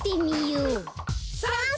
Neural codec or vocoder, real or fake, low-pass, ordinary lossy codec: none; real; none; none